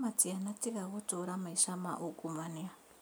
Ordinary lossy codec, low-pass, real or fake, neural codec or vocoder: none; none; real; none